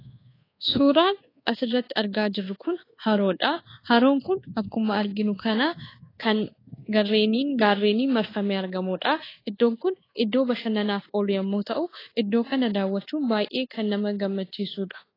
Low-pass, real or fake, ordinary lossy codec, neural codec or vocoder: 5.4 kHz; fake; AAC, 24 kbps; codec, 24 kHz, 1.2 kbps, DualCodec